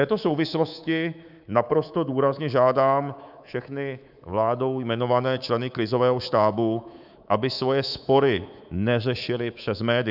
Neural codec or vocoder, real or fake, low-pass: codec, 24 kHz, 3.1 kbps, DualCodec; fake; 5.4 kHz